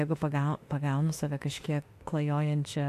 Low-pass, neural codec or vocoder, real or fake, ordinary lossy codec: 14.4 kHz; autoencoder, 48 kHz, 32 numbers a frame, DAC-VAE, trained on Japanese speech; fake; AAC, 64 kbps